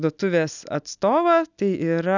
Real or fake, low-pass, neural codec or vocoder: real; 7.2 kHz; none